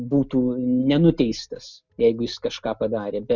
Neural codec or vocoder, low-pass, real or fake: none; 7.2 kHz; real